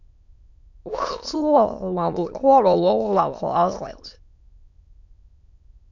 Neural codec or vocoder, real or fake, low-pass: autoencoder, 22.05 kHz, a latent of 192 numbers a frame, VITS, trained on many speakers; fake; 7.2 kHz